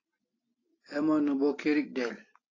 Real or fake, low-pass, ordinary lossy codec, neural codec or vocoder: real; 7.2 kHz; AAC, 32 kbps; none